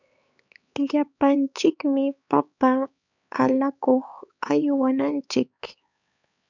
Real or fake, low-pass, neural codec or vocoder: fake; 7.2 kHz; codec, 16 kHz, 4 kbps, X-Codec, HuBERT features, trained on LibriSpeech